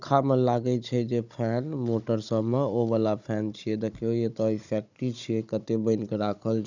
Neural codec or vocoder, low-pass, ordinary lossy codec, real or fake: codec, 16 kHz, 16 kbps, FunCodec, trained on Chinese and English, 50 frames a second; 7.2 kHz; none; fake